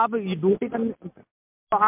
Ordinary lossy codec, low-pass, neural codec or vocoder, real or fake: MP3, 32 kbps; 3.6 kHz; none; real